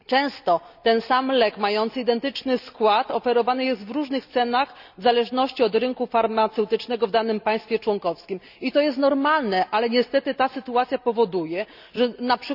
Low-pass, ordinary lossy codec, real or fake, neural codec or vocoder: 5.4 kHz; none; real; none